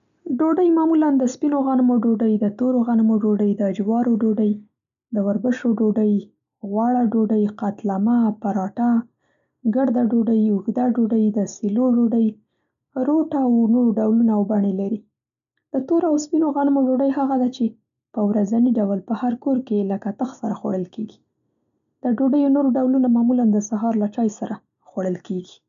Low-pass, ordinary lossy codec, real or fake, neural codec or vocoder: 7.2 kHz; none; real; none